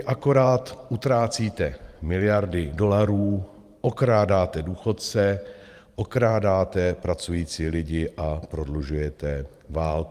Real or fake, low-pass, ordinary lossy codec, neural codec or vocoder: fake; 14.4 kHz; Opus, 32 kbps; vocoder, 44.1 kHz, 128 mel bands every 512 samples, BigVGAN v2